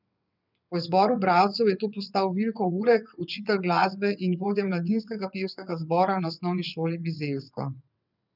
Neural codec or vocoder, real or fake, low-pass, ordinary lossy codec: vocoder, 22.05 kHz, 80 mel bands, WaveNeXt; fake; 5.4 kHz; none